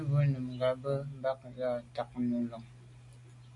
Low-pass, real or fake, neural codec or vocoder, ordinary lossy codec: 10.8 kHz; real; none; AAC, 64 kbps